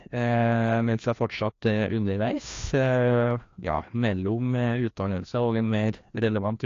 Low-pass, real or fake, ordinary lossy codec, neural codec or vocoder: 7.2 kHz; fake; AAC, 48 kbps; codec, 16 kHz, 1 kbps, FreqCodec, larger model